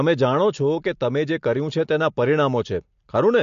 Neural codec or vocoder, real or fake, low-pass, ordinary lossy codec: none; real; 7.2 kHz; MP3, 48 kbps